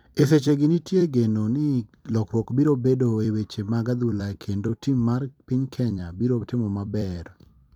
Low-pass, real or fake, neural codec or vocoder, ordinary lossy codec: 19.8 kHz; fake; vocoder, 44.1 kHz, 128 mel bands every 256 samples, BigVGAN v2; none